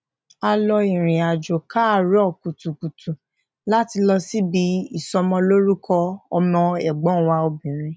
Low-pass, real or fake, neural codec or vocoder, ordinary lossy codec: none; real; none; none